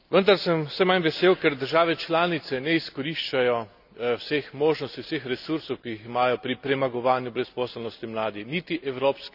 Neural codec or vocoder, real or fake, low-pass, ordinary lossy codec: none; real; 5.4 kHz; none